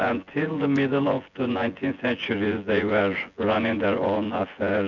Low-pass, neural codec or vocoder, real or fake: 7.2 kHz; vocoder, 24 kHz, 100 mel bands, Vocos; fake